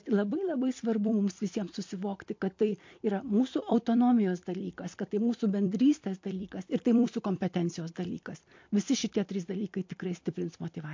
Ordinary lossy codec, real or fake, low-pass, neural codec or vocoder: MP3, 48 kbps; fake; 7.2 kHz; vocoder, 44.1 kHz, 128 mel bands every 256 samples, BigVGAN v2